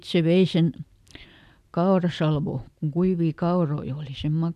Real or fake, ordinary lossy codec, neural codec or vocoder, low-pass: real; none; none; 14.4 kHz